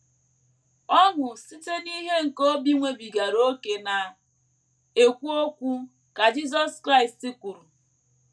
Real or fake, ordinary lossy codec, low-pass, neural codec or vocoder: real; none; none; none